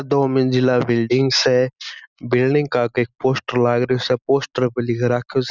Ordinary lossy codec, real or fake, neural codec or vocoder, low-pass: none; real; none; 7.2 kHz